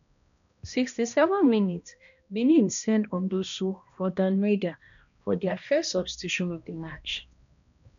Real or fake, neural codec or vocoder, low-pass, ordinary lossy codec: fake; codec, 16 kHz, 1 kbps, X-Codec, HuBERT features, trained on balanced general audio; 7.2 kHz; none